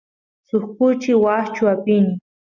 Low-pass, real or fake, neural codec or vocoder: 7.2 kHz; real; none